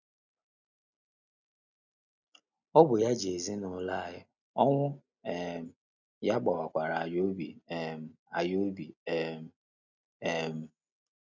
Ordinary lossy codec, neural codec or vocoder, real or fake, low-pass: none; none; real; 7.2 kHz